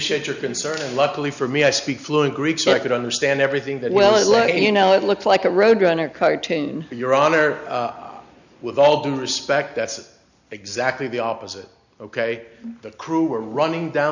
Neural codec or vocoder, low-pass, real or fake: none; 7.2 kHz; real